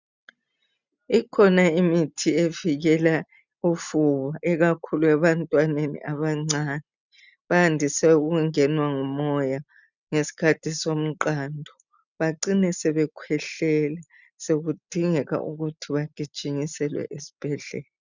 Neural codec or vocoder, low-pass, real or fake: none; 7.2 kHz; real